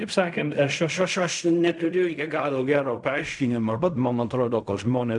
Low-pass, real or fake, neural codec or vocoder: 10.8 kHz; fake; codec, 16 kHz in and 24 kHz out, 0.4 kbps, LongCat-Audio-Codec, fine tuned four codebook decoder